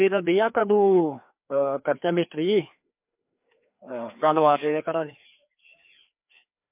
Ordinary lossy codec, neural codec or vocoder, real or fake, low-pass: MP3, 32 kbps; codec, 16 kHz, 2 kbps, FreqCodec, larger model; fake; 3.6 kHz